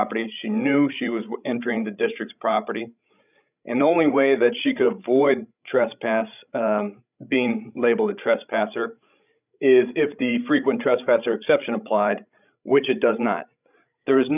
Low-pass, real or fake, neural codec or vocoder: 3.6 kHz; fake; codec, 16 kHz, 16 kbps, FreqCodec, larger model